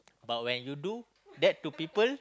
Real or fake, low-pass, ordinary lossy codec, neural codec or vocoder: real; none; none; none